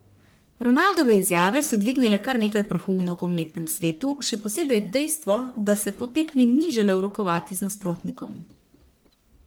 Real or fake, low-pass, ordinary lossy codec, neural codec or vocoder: fake; none; none; codec, 44.1 kHz, 1.7 kbps, Pupu-Codec